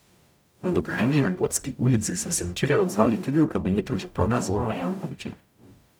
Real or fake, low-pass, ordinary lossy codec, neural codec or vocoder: fake; none; none; codec, 44.1 kHz, 0.9 kbps, DAC